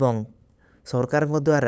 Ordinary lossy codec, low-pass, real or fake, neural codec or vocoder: none; none; fake; codec, 16 kHz, 8 kbps, FunCodec, trained on LibriTTS, 25 frames a second